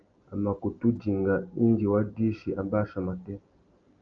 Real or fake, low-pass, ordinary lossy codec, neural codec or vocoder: real; 7.2 kHz; Opus, 32 kbps; none